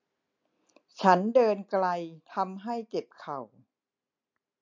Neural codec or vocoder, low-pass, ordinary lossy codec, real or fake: none; 7.2 kHz; MP3, 48 kbps; real